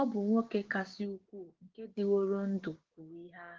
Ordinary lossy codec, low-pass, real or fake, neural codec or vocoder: Opus, 16 kbps; 7.2 kHz; fake; codec, 16 kHz, 6 kbps, DAC